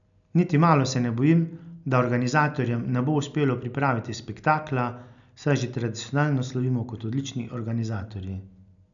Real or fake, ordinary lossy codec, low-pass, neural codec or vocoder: real; none; 7.2 kHz; none